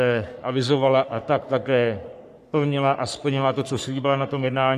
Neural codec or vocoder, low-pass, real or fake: codec, 44.1 kHz, 3.4 kbps, Pupu-Codec; 14.4 kHz; fake